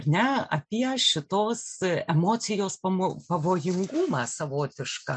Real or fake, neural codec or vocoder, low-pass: real; none; 9.9 kHz